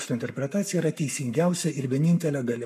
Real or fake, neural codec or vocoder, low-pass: fake; codec, 44.1 kHz, 7.8 kbps, Pupu-Codec; 14.4 kHz